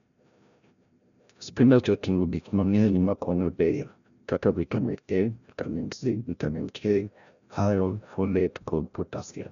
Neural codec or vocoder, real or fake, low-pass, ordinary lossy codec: codec, 16 kHz, 0.5 kbps, FreqCodec, larger model; fake; 7.2 kHz; none